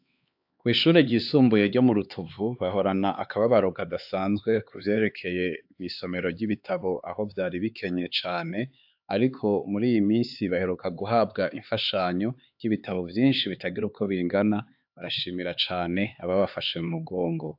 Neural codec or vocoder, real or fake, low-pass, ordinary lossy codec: codec, 16 kHz, 4 kbps, X-Codec, HuBERT features, trained on LibriSpeech; fake; 5.4 kHz; AAC, 48 kbps